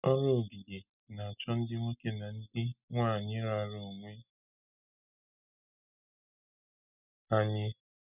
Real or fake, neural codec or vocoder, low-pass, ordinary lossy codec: real; none; 3.6 kHz; none